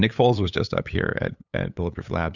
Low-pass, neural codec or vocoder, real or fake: 7.2 kHz; codec, 16 kHz, 8 kbps, FunCodec, trained on LibriTTS, 25 frames a second; fake